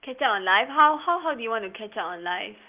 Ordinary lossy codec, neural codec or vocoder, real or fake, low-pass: Opus, 32 kbps; none; real; 3.6 kHz